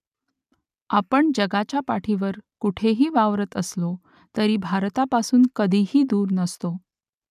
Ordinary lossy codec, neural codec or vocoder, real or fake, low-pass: none; none; real; 14.4 kHz